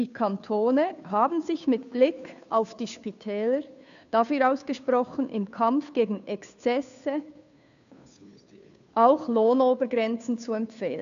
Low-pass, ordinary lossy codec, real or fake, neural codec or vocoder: 7.2 kHz; none; fake; codec, 16 kHz, 2 kbps, FunCodec, trained on Chinese and English, 25 frames a second